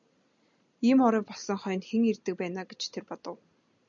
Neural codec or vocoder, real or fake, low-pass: none; real; 7.2 kHz